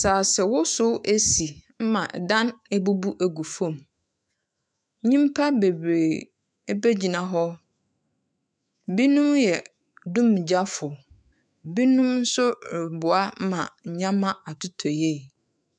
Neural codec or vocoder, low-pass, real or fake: autoencoder, 48 kHz, 128 numbers a frame, DAC-VAE, trained on Japanese speech; 9.9 kHz; fake